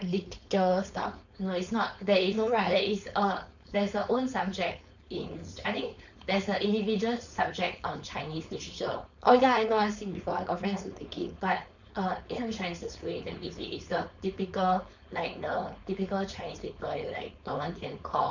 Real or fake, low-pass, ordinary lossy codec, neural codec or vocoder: fake; 7.2 kHz; none; codec, 16 kHz, 4.8 kbps, FACodec